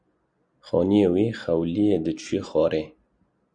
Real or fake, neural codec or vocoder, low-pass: real; none; 9.9 kHz